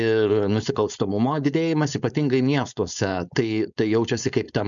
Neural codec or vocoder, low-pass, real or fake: codec, 16 kHz, 4.8 kbps, FACodec; 7.2 kHz; fake